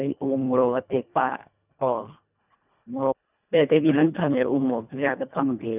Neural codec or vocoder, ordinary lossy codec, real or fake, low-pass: codec, 24 kHz, 1.5 kbps, HILCodec; none; fake; 3.6 kHz